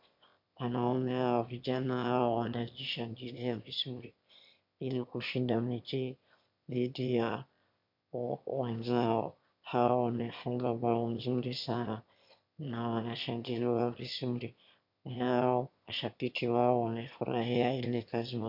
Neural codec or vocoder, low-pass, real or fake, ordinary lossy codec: autoencoder, 22.05 kHz, a latent of 192 numbers a frame, VITS, trained on one speaker; 5.4 kHz; fake; MP3, 48 kbps